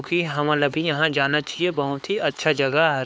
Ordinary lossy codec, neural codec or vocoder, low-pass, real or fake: none; codec, 16 kHz, 4 kbps, X-Codec, HuBERT features, trained on LibriSpeech; none; fake